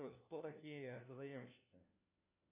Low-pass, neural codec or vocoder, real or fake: 3.6 kHz; codec, 16 kHz, 1 kbps, FunCodec, trained on LibriTTS, 50 frames a second; fake